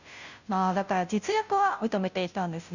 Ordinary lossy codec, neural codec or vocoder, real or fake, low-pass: none; codec, 16 kHz, 0.5 kbps, FunCodec, trained on Chinese and English, 25 frames a second; fake; 7.2 kHz